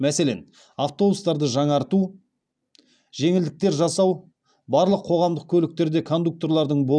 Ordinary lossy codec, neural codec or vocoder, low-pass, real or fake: none; none; 9.9 kHz; real